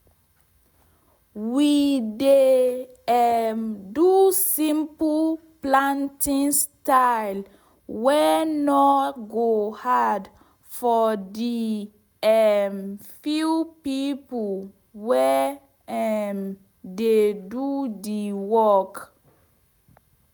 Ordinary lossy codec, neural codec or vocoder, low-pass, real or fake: none; none; none; real